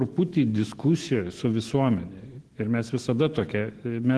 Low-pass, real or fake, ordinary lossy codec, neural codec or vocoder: 10.8 kHz; fake; Opus, 16 kbps; autoencoder, 48 kHz, 128 numbers a frame, DAC-VAE, trained on Japanese speech